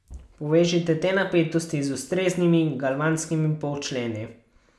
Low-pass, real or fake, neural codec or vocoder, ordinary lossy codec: none; real; none; none